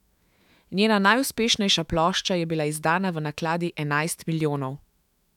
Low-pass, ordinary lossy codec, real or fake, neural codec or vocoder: 19.8 kHz; none; fake; autoencoder, 48 kHz, 128 numbers a frame, DAC-VAE, trained on Japanese speech